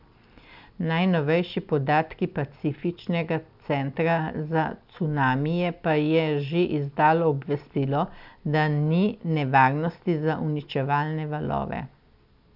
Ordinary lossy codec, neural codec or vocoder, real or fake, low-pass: none; none; real; 5.4 kHz